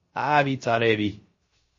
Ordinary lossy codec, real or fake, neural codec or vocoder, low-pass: MP3, 32 kbps; fake; codec, 16 kHz, 0.3 kbps, FocalCodec; 7.2 kHz